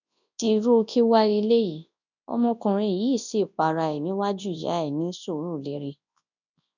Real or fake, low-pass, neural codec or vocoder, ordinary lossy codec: fake; 7.2 kHz; codec, 24 kHz, 0.9 kbps, WavTokenizer, large speech release; none